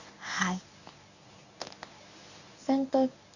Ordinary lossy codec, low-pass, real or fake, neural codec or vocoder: none; 7.2 kHz; fake; codec, 24 kHz, 0.9 kbps, WavTokenizer, medium speech release version 1